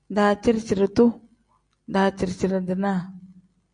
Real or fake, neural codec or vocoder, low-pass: real; none; 9.9 kHz